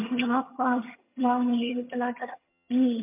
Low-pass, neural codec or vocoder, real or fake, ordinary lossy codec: 3.6 kHz; vocoder, 22.05 kHz, 80 mel bands, HiFi-GAN; fake; MP3, 32 kbps